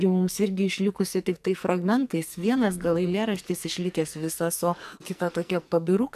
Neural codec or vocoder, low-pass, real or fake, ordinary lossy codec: codec, 44.1 kHz, 2.6 kbps, SNAC; 14.4 kHz; fake; AAC, 96 kbps